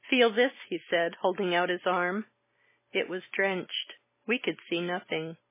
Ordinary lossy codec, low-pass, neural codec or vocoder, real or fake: MP3, 16 kbps; 3.6 kHz; none; real